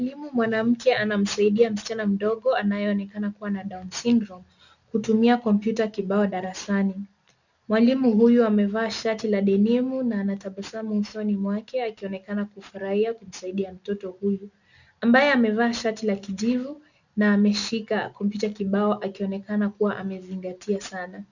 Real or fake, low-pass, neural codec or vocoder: real; 7.2 kHz; none